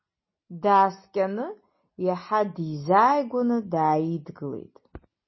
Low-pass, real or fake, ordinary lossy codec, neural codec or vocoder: 7.2 kHz; real; MP3, 24 kbps; none